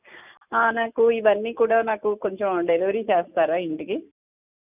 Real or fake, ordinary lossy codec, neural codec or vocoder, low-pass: real; none; none; 3.6 kHz